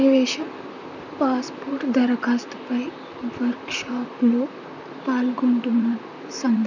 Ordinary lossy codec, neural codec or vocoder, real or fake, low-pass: none; vocoder, 44.1 kHz, 128 mel bands, Pupu-Vocoder; fake; 7.2 kHz